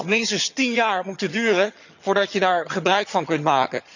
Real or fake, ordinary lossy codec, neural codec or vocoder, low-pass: fake; none; vocoder, 22.05 kHz, 80 mel bands, HiFi-GAN; 7.2 kHz